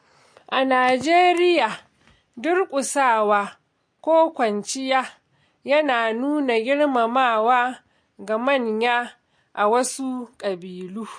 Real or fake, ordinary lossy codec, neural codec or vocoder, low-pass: real; MP3, 48 kbps; none; 9.9 kHz